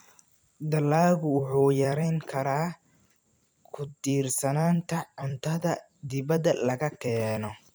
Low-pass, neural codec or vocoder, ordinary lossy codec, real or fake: none; vocoder, 44.1 kHz, 128 mel bands every 256 samples, BigVGAN v2; none; fake